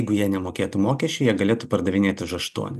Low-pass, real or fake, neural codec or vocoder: 14.4 kHz; real; none